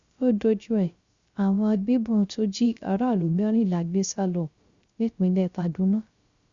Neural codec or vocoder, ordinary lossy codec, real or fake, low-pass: codec, 16 kHz, 0.3 kbps, FocalCodec; Opus, 64 kbps; fake; 7.2 kHz